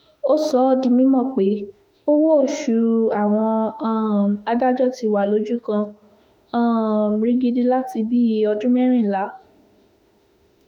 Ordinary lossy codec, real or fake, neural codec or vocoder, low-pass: none; fake; autoencoder, 48 kHz, 32 numbers a frame, DAC-VAE, trained on Japanese speech; 19.8 kHz